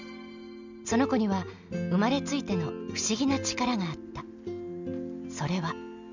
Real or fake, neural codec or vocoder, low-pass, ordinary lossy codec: real; none; 7.2 kHz; none